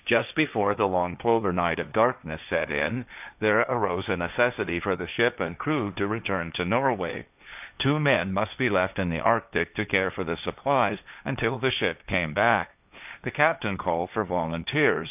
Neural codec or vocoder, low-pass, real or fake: codec, 16 kHz, 1.1 kbps, Voila-Tokenizer; 3.6 kHz; fake